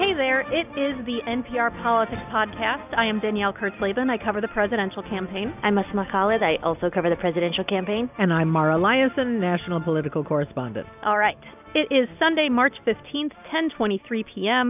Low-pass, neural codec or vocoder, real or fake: 3.6 kHz; none; real